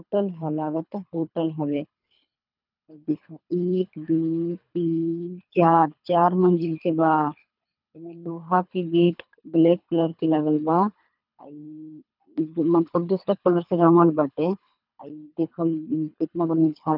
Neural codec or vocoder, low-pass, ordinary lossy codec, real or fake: codec, 24 kHz, 3 kbps, HILCodec; 5.4 kHz; none; fake